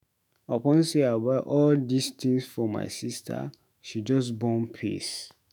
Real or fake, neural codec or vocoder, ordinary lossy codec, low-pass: fake; autoencoder, 48 kHz, 128 numbers a frame, DAC-VAE, trained on Japanese speech; none; none